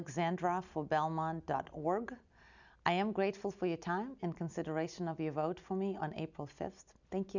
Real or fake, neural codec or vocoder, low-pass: real; none; 7.2 kHz